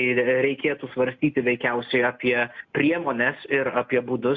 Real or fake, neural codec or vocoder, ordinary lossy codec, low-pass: real; none; MP3, 64 kbps; 7.2 kHz